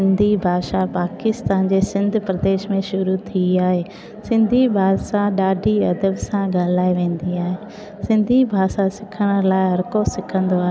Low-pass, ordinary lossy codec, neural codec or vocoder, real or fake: none; none; none; real